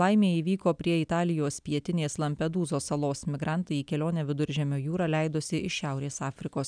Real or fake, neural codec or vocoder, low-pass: real; none; 9.9 kHz